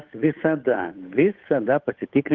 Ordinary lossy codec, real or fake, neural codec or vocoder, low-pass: Opus, 32 kbps; fake; codec, 16 kHz, 8 kbps, FunCodec, trained on Chinese and English, 25 frames a second; 7.2 kHz